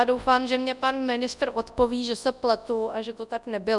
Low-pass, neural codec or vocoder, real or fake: 10.8 kHz; codec, 24 kHz, 0.9 kbps, WavTokenizer, large speech release; fake